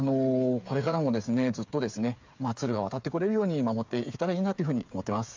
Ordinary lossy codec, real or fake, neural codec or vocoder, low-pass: MP3, 64 kbps; fake; codec, 16 kHz, 8 kbps, FreqCodec, smaller model; 7.2 kHz